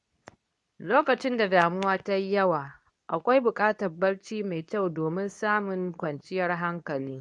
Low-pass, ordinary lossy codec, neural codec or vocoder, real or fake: 10.8 kHz; none; codec, 24 kHz, 0.9 kbps, WavTokenizer, medium speech release version 2; fake